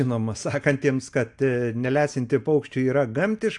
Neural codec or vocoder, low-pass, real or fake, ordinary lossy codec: none; 10.8 kHz; real; AAC, 64 kbps